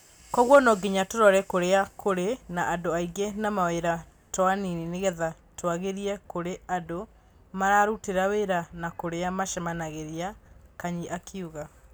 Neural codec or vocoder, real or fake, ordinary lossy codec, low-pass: none; real; none; none